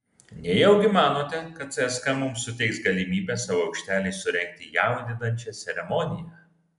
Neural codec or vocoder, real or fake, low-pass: none; real; 10.8 kHz